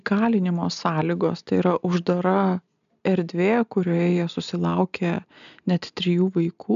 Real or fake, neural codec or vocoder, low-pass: real; none; 7.2 kHz